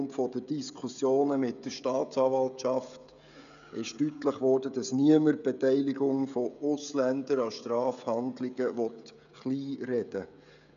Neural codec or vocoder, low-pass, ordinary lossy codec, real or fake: codec, 16 kHz, 16 kbps, FreqCodec, smaller model; 7.2 kHz; none; fake